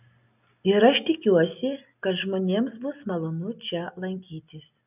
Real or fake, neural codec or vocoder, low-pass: real; none; 3.6 kHz